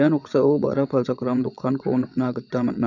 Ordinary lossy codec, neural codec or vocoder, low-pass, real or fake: none; vocoder, 22.05 kHz, 80 mel bands, WaveNeXt; 7.2 kHz; fake